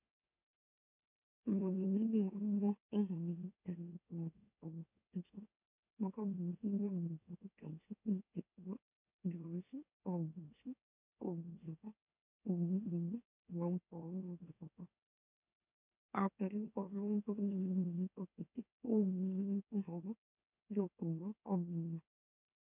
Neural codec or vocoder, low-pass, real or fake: autoencoder, 44.1 kHz, a latent of 192 numbers a frame, MeloTTS; 3.6 kHz; fake